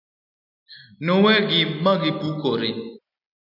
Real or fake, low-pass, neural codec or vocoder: real; 5.4 kHz; none